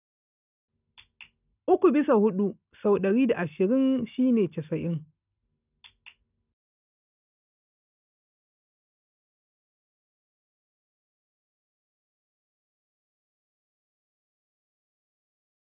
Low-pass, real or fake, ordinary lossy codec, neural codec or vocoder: 3.6 kHz; real; none; none